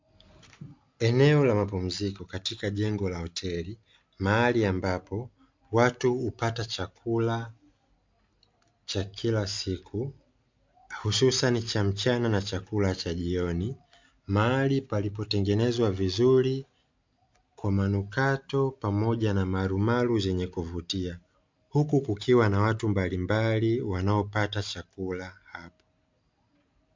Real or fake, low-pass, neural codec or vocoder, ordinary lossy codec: real; 7.2 kHz; none; MP3, 64 kbps